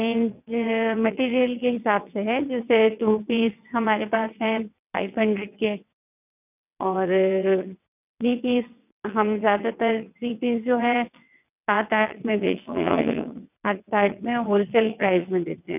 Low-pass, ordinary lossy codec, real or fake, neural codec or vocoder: 3.6 kHz; none; fake; vocoder, 22.05 kHz, 80 mel bands, WaveNeXt